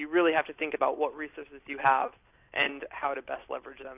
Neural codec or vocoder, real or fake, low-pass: none; real; 3.6 kHz